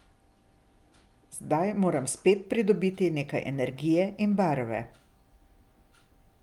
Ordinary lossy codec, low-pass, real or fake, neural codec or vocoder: Opus, 32 kbps; 19.8 kHz; real; none